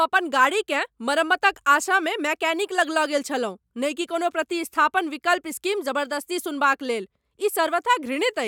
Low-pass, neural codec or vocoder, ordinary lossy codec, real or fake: 19.8 kHz; none; none; real